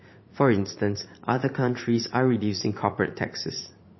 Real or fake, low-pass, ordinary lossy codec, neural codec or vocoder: fake; 7.2 kHz; MP3, 24 kbps; vocoder, 44.1 kHz, 80 mel bands, Vocos